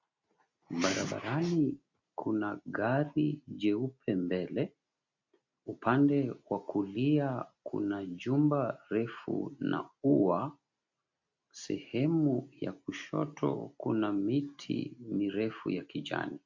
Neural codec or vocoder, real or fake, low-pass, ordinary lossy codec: none; real; 7.2 kHz; MP3, 48 kbps